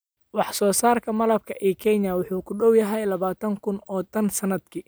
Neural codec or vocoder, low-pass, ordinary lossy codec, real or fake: none; none; none; real